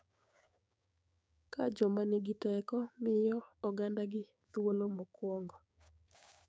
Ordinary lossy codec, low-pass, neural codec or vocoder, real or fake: none; none; codec, 16 kHz, 6 kbps, DAC; fake